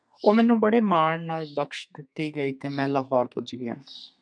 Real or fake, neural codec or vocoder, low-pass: fake; codec, 32 kHz, 1.9 kbps, SNAC; 9.9 kHz